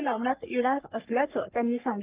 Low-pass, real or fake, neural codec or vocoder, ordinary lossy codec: 3.6 kHz; fake; codec, 44.1 kHz, 3.4 kbps, Pupu-Codec; Opus, 24 kbps